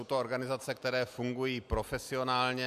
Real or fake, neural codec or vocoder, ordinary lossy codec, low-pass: real; none; MP3, 96 kbps; 14.4 kHz